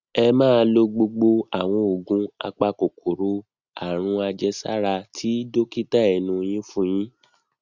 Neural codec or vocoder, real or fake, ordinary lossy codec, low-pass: none; real; Opus, 64 kbps; 7.2 kHz